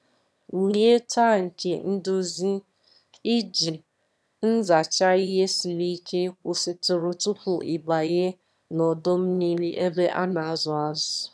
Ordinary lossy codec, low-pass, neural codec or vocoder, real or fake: none; none; autoencoder, 22.05 kHz, a latent of 192 numbers a frame, VITS, trained on one speaker; fake